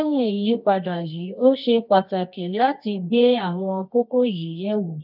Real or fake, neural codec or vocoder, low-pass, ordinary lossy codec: fake; codec, 24 kHz, 0.9 kbps, WavTokenizer, medium music audio release; 5.4 kHz; none